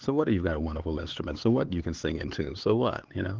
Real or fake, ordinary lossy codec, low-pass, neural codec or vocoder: fake; Opus, 16 kbps; 7.2 kHz; codec, 16 kHz, 4 kbps, X-Codec, HuBERT features, trained on LibriSpeech